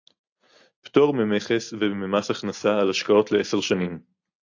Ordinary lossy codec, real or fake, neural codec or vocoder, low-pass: AAC, 48 kbps; real; none; 7.2 kHz